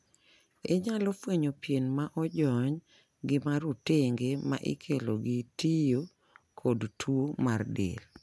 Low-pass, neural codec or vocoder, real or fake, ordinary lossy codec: none; none; real; none